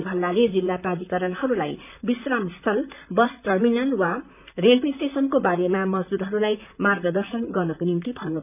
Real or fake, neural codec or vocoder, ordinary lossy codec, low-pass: fake; vocoder, 44.1 kHz, 128 mel bands, Pupu-Vocoder; none; 3.6 kHz